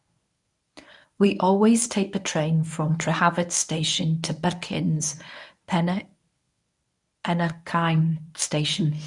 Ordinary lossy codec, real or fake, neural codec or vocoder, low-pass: none; fake; codec, 24 kHz, 0.9 kbps, WavTokenizer, medium speech release version 1; 10.8 kHz